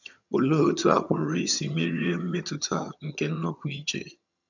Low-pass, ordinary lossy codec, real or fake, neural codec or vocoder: 7.2 kHz; none; fake; vocoder, 22.05 kHz, 80 mel bands, HiFi-GAN